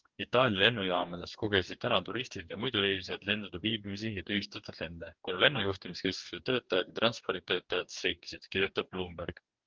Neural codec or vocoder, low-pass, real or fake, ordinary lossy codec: codec, 44.1 kHz, 2.6 kbps, DAC; 7.2 kHz; fake; Opus, 32 kbps